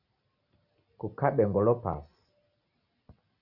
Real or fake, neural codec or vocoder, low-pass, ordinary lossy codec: real; none; 5.4 kHz; Opus, 64 kbps